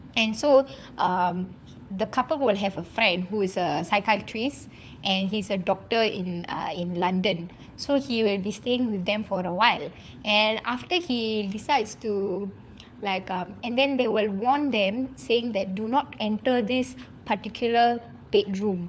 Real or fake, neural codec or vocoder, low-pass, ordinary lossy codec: fake; codec, 16 kHz, 4 kbps, FunCodec, trained on LibriTTS, 50 frames a second; none; none